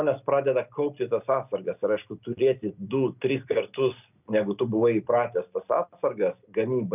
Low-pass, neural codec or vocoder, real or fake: 3.6 kHz; none; real